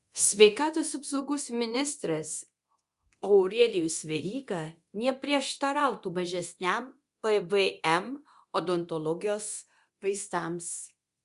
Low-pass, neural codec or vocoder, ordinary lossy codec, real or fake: 10.8 kHz; codec, 24 kHz, 0.9 kbps, DualCodec; Opus, 64 kbps; fake